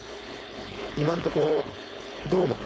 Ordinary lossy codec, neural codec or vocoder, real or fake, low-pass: none; codec, 16 kHz, 4.8 kbps, FACodec; fake; none